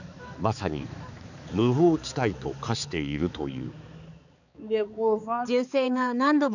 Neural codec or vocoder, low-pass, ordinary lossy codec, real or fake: codec, 16 kHz, 4 kbps, X-Codec, HuBERT features, trained on balanced general audio; 7.2 kHz; none; fake